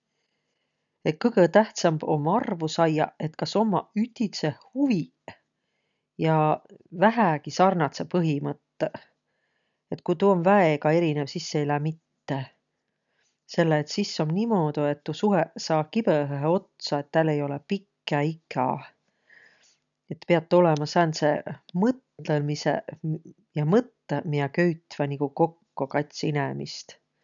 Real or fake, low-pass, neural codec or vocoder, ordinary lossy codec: real; 7.2 kHz; none; none